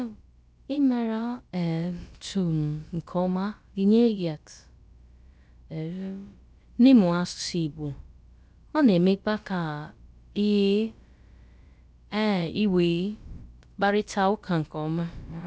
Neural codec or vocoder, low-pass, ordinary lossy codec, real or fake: codec, 16 kHz, about 1 kbps, DyCAST, with the encoder's durations; none; none; fake